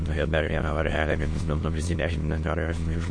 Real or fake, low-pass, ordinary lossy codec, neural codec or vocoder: fake; 9.9 kHz; MP3, 48 kbps; autoencoder, 22.05 kHz, a latent of 192 numbers a frame, VITS, trained on many speakers